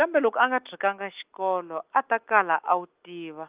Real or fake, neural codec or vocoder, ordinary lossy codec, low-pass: real; none; Opus, 32 kbps; 3.6 kHz